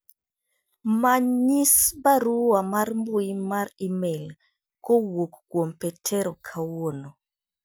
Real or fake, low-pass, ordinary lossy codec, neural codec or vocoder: real; none; none; none